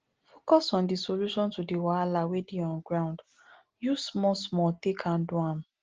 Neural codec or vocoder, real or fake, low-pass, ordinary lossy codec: none; real; 7.2 kHz; Opus, 16 kbps